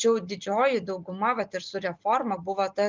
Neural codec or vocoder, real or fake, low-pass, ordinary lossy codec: none; real; 7.2 kHz; Opus, 32 kbps